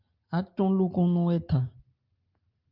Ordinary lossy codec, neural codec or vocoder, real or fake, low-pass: Opus, 24 kbps; none; real; 5.4 kHz